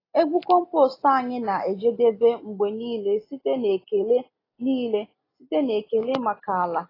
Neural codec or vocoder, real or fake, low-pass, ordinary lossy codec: none; real; 5.4 kHz; AAC, 24 kbps